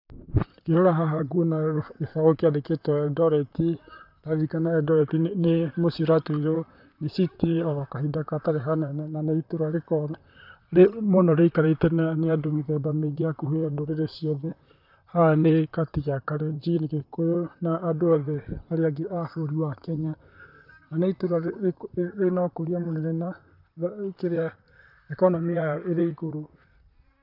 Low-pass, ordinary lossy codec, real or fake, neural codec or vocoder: 5.4 kHz; none; fake; vocoder, 44.1 kHz, 128 mel bands, Pupu-Vocoder